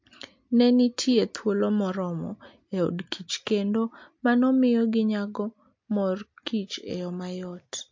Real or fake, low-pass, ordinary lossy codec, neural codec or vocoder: real; 7.2 kHz; MP3, 48 kbps; none